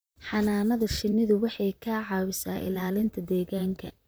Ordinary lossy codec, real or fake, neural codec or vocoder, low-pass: none; fake; vocoder, 44.1 kHz, 128 mel bands, Pupu-Vocoder; none